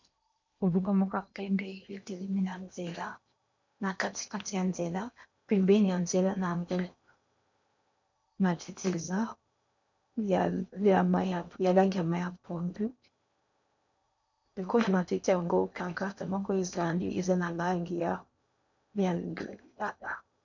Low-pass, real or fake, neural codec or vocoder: 7.2 kHz; fake; codec, 16 kHz in and 24 kHz out, 0.8 kbps, FocalCodec, streaming, 65536 codes